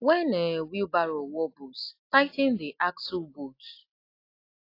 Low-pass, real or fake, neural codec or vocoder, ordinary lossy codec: 5.4 kHz; real; none; AAC, 32 kbps